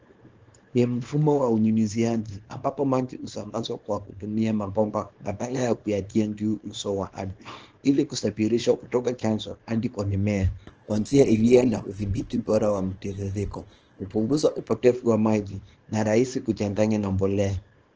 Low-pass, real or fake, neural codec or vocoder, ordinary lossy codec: 7.2 kHz; fake; codec, 24 kHz, 0.9 kbps, WavTokenizer, small release; Opus, 16 kbps